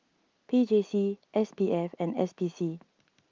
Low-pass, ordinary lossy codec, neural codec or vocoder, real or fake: 7.2 kHz; Opus, 32 kbps; none; real